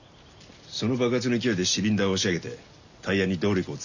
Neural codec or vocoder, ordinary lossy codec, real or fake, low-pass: none; none; real; 7.2 kHz